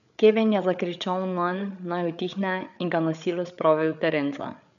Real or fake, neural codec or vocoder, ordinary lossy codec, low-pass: fake; codec, 16 kHz, 8 kbps, FreqCodec, larger model; none; 7.2 kHz